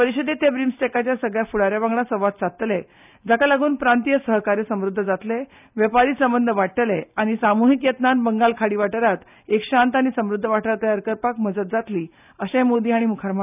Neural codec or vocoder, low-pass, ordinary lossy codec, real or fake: none; 3.6 kHz; none; real